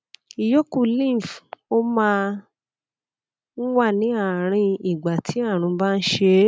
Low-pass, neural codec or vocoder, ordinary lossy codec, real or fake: none; none; none; real